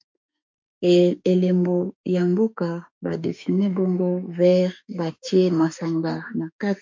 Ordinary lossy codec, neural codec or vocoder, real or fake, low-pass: MP3, 48 kbps; autoencoder, 48 kHz, 32 numbers a frame, DAC-VAE, trained on Japanese speech; fake; 7.2 kHz